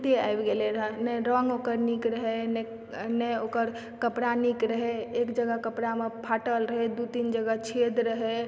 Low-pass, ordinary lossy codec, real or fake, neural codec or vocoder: none; none; real; none